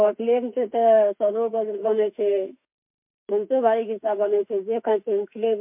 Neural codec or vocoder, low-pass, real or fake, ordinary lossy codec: autoencoder, 48 kHz, 32 numbers a frame, DAC-VAE, trained on Japanese speech; 3.6 kHz; fake; MP3, 32 kbps